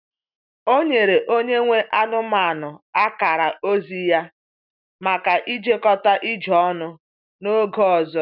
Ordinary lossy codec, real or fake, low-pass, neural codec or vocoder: none; real; 5.4 kHz; none